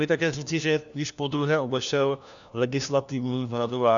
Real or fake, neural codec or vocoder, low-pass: fake; codec, 16 kHz, 1 kbps, FunCodec, trained on LibriTTS, 50 frames a second; 7.2 kHz